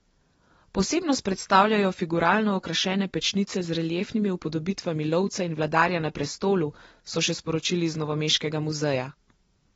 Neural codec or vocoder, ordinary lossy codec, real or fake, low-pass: none; AAC, 24 kbps; real; 14.4 kHz